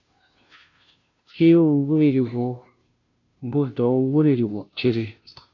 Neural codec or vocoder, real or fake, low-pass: codec, 16 kHz, 0.5 kbps, FunCodec, trained on Chinese and English, 25 frames a second; fake; 7.2 kHz